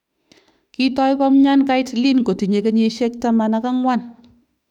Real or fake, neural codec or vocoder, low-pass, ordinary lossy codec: fake; autoencoder, 48 kHz, 32 numbers a frame, DAC-VAE, trained on Japanese speech; 19.8 kHz; none